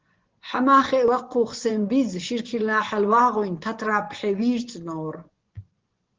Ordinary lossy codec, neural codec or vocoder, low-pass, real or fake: Opus, 16 kbps; vocoder, 24 kHz, 100 mel bands, Vocos; 7.2 kHz; fake